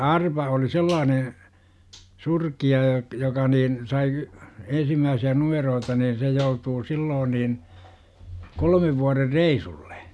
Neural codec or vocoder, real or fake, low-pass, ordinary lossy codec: none; real; none; none